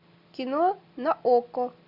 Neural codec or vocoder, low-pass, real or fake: none; 5.4 kHz; real